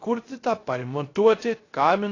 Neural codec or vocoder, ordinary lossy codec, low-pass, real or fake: codec, 16 kHz, 0.3 kbps, FocalCodec; AAC, 32 kbps; 7.2 kHz; fake